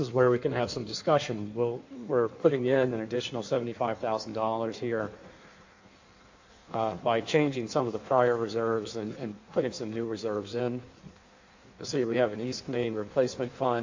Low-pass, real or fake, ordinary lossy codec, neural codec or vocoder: 7.2 kHz; fake; AAC, 48 kbps; codec, 16 kHz in and 24 kHz out, 1.1 kbps, FireRedTTS-2 codec